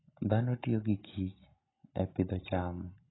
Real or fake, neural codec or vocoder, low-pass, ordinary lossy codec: real; none; 7.2 kHz; AAC, 16 kbps